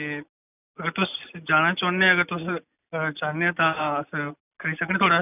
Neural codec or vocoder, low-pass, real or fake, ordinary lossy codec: none; 3.6 kHz; real; none